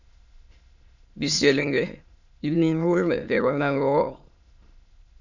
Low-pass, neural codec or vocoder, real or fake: 7.2 kHz; autoencoder, 22.05 kHz, a latent of 192 numbers a frame, VITS, trained on many speakers; fake